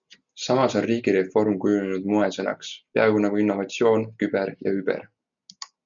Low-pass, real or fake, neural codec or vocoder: 7.2 kHz; real; none